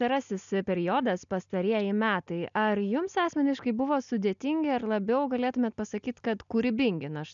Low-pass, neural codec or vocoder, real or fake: 7.2 kHz; none; real